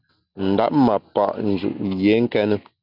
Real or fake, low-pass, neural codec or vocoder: real; 5.4 kHz; none